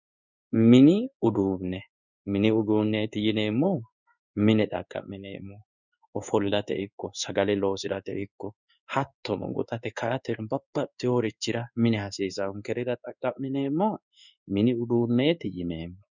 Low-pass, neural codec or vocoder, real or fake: 7.2 kHz; codec, 16 kHz in and 24 kHz out, 1 kbps, XY-Tokenizer; fake